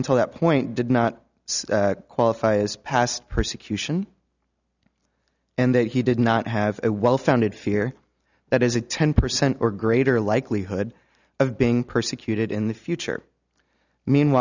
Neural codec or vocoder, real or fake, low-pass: none; real; 7.2 kHz